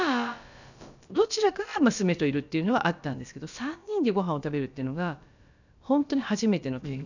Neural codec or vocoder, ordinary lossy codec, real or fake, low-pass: codec, 16 kHz, about 1 kbps, DyCAST, with the encoder's durations; none; fake; 7.2 kHz